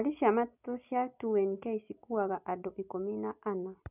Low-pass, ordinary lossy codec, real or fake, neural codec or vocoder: 3.6 kHz; none; real; none